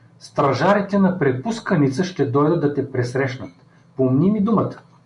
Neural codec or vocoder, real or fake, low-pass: none; real; 10.8 kHz